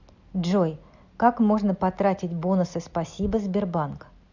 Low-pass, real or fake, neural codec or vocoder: 7.2 kHz; real; none